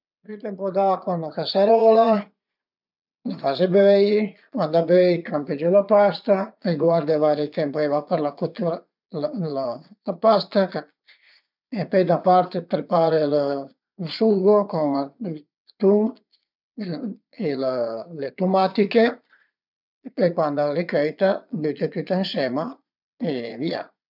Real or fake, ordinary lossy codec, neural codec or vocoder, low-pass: fake; none; vocoder, 22.05 kHz, 80 mel bands, Vocos; 5.4 kHz